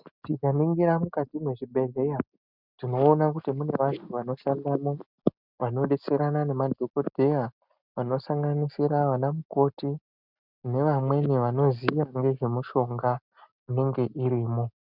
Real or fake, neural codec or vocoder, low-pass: real; none; 5.4 kHz